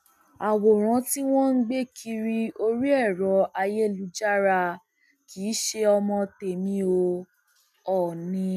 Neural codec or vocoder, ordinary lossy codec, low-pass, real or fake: none; none; 14.4 kHz; real